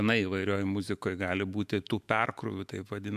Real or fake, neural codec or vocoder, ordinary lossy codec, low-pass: fake; autoencoder, 48 kHz, 128 numbers a frame, DAC-VAE, trained on Japanese speech; AAC, 96 kbps; 14.4 kHz